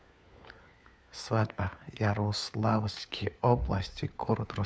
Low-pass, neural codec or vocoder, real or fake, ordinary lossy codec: none; codec, 16 kHz, 4 kbps, FunCodec, trained on LibriTTS, 50 frames a second; fake; none